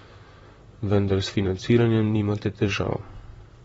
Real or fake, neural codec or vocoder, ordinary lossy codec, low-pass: fake; vocoder, 44.1 kHz, 128 mel bands, Pupu-Vocoder; AAC, 24 kbps; 19.8 kHz